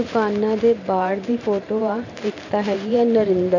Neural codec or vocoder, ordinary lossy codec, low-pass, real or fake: vocoder, 44.1 kHz, 128 mel bands every 512 samples, BigVGAN v2; none; 7.2 kHz; fake